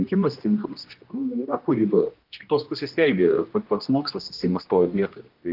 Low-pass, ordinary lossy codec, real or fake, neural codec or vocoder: 5.4 kHz; Opus, 32 kbps; fake; codec, 16 kHz, 1 kbps, X-Codec, HuBERT features, trained on general audio